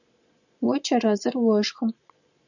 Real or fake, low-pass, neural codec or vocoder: fake; 7.2 kHz; vocoder, 22.05 kHz, 80 mel bands, Vocos